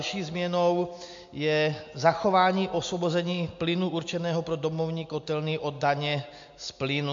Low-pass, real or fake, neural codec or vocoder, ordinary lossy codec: 7.2 kHz; real; none; MP3, 48 kbps